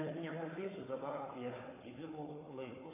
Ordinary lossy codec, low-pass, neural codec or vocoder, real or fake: AAC, 16 kbps; 3.6 kHz; codec, 16 kHz, 4 kbps, FunCodec, trained on Chinese and English, 50 frames a second; fake